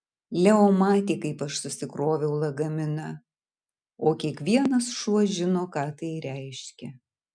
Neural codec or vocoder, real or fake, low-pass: none; real; 9.9 kHz